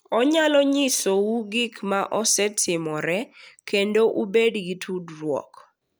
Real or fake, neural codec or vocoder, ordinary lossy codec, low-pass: real; none; none; none